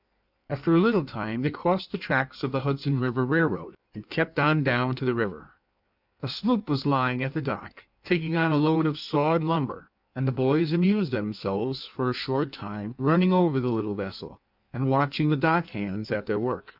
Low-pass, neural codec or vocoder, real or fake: 5.4 kHz; codec, 16 kHz in and 24 kHz out, 1.1 kbps, FireRedTTS-2 codec; fake